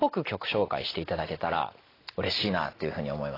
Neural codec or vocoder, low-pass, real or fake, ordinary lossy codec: none; 5.4 kHz; real; AAC, 24 kbps